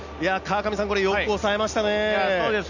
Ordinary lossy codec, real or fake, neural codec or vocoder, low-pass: none; real; none; 7.2 kHz